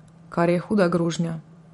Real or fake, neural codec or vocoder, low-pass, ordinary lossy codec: fake; vocoder, 44.1 kHz, 128 mel bands every 512 samples, BigVGAN v2; 19.8 kHz; MP3, 48 kbps